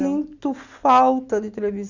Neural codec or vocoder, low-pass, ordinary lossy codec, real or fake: none; 7.2 kHz; none; real